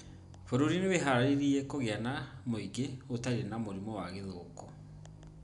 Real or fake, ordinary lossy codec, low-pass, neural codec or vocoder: real; none; 10.8 kHz; none